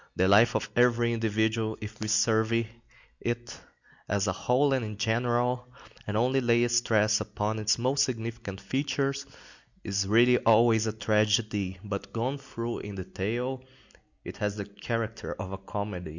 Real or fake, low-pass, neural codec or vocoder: real; 7.2 kHz; none